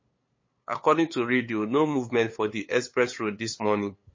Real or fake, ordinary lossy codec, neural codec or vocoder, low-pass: fake; MP3, 32 kbps; codec, 16 kHz, 8 kbps, FunCodec, trained on LibriTTS, 25 frames a second; 7.2 kHz